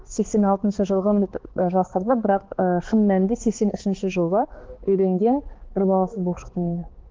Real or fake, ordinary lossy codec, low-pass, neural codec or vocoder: fake; Opus, 16 kbps; 7.2 kHz; codec, 16 kHz, 2 kbps, X-Codec, HuBERT features, trained on balanced general audio